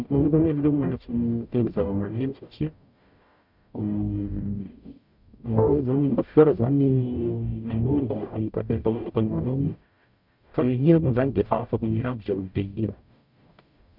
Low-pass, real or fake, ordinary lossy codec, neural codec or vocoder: 5.4 kHz; fake; none; codec, 44.1 kHz, 0.9 kbps, DAC